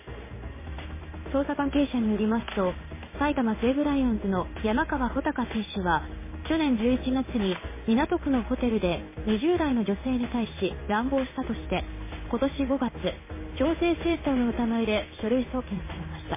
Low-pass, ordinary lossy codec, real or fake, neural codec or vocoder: 3.6 kHz; MP3, 16 kbps; fake; codec, 16 kHz in and 24 kHz out, 1 kbps, XY-Tokenizer